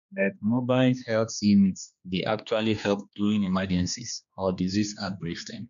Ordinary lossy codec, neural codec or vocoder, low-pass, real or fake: none; codec, 16 kHz, 2 kbps, X-Codec, HuBERT features, trained on general audio; 7.2 kHz; fake